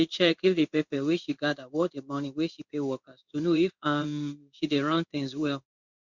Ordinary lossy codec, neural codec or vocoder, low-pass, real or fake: Opus, 64 kbps; codec, 16 kHz in and 24 kHz out, 1 kbps, XY-Tokenizer; 7.2 kHz; fake